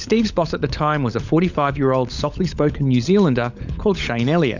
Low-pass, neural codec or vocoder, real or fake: 7.2 kHz; codec, 16 kHz, 16 kbps, FunCodec, trained on LibriTTS, 50 frames a second; fake